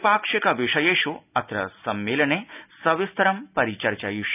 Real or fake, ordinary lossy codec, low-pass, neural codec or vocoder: real; none; 3.6 kHz; none